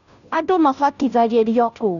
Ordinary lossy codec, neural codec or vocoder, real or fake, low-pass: none; codec, 16 kHz, 0.5 kbps, FunCodec, trained on Chinese and English, 25 frames a second; fake; 7.2 kHz